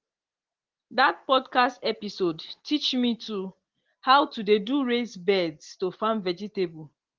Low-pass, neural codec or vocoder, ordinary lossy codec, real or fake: 7.2 kHz; none; Opus, 16 kbps; real